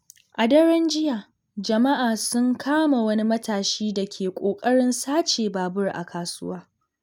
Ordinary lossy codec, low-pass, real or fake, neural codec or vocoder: none; 19.8 kHz; real; none